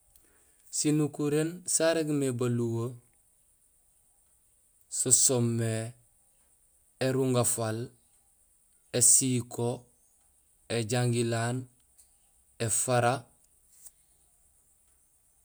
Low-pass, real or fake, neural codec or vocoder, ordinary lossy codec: none; real; none; none